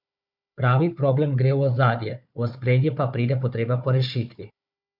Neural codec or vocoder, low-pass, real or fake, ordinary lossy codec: codec, 16 kHz, 4 kbps, FunCodec, trained on Chinese and English, 50 frames a second; 5.4 kHz; fake; MP3, 48 kbps